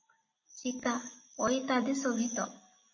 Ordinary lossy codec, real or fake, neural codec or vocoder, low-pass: MP3, 32 kbps; real; none; 7.2 kHz